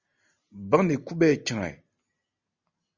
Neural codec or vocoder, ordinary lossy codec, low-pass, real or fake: none; Opus, 64 kbps; 7.2 kHz; real